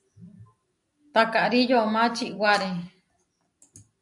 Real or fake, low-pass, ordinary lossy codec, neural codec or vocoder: real; 10.8 kHz; AAC, 64 kbps; none